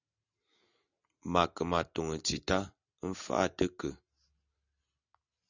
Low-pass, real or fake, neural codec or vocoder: 7.2 kHz; real; none